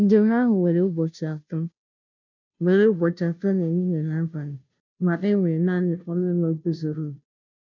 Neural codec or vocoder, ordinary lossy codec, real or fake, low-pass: codec, 16 kHz, 0.5 kbps, FunCodec, trained on Chinese and English, 25 frames a second; none; fake; 7.2 kHz